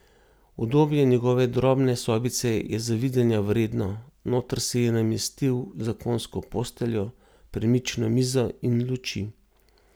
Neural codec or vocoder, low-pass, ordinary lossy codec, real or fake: none; none; none; real